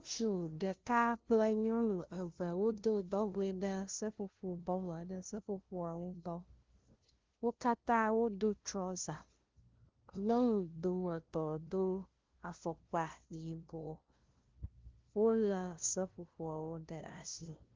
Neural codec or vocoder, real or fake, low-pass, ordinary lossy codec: codec, 16 kHz, 0.5 kbps, FunCodec, trained on LibriTTS, 25 frames a second; fake; 7.2 kHz; Opus, 16 kbps